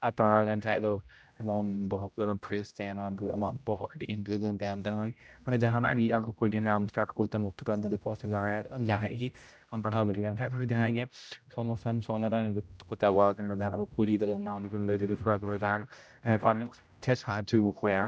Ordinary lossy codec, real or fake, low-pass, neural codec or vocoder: none; fake; none; codec, 16 kHz, 0.5 kbps, X-Codec, HuBERT features, trained on general audio